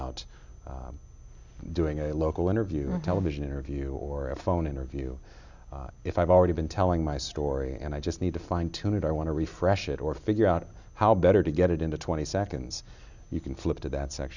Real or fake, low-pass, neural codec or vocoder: real; 7.2 kHz; none